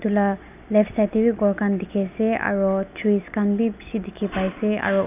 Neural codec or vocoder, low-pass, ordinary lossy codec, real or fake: none; 3.6 kHz; none; real